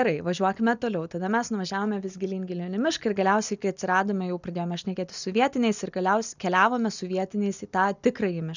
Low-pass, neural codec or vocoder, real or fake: 7.2 kHz; none; real